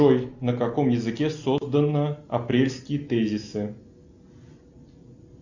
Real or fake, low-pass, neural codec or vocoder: real; 7.2 kHz; none